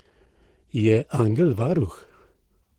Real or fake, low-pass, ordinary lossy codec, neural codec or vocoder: fake; 19.8 kHz; Opus, 16 kbps; vocoder, 48 kHz, 128 mel bands, Vocos